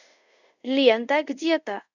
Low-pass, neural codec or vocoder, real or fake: 7.2 kHz; codec, 24 kHz, 0.5 kbps, DualCodec; fake